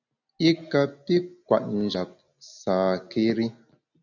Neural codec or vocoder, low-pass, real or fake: none; 7.2 kHz; real